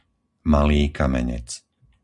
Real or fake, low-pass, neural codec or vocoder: real; 10.8 kHz; none